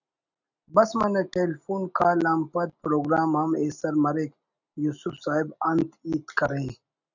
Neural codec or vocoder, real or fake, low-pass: none; real; 7.2 kHz